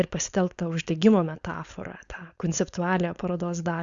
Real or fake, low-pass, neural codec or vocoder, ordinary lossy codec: fake; 7.2 kHz; codec, 16 kHz, 4.8 kbps, FACodec; Opus, 64 kbps